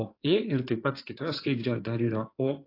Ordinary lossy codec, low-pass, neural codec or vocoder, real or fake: AAC, 32 kbps; 5.4 kHz; codec, 16 kHz in and 24 kHz out, 2.2 kbps, FireRedTTS-2 codec; fake